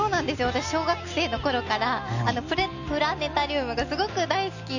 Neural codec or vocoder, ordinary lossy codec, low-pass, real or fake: none; none; 7.2 kHz; real